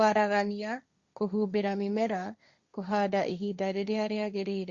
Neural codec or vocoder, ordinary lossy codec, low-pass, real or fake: codec, 16 kHz, 1.1 kbps, Voila-Tokenizer; Opus, 64 kbps; 7.2 kHz; fake